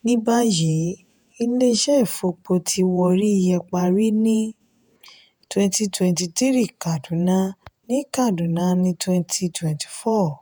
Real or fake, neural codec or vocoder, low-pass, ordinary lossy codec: fake; vocoder, 48 kHz, 128 mel bands, Vocos; none; none